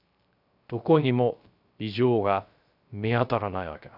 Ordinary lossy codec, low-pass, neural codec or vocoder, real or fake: none; 5.4 kHz; codec, 16 kHz, 0.3 kbps, FocalCodec; fake